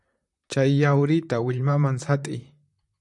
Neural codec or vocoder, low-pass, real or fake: vocoder, 44.1 kHz, 128 mel bands, Pupu-Vocoder; 10.8 kHz; fake